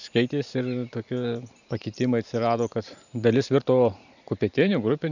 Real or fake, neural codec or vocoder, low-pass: fake; vocoder, 44.1 kHz, 128 mel bands every 512 samples, BigVGAN v2; 7.2 kHz